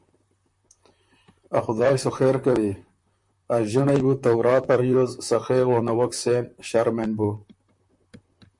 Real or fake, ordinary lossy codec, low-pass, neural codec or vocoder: fake; MP3, 64 kbps; 10.8 kHz; vocoder, 44.1 kHz, 128 mel bands, Pupu-Vocoder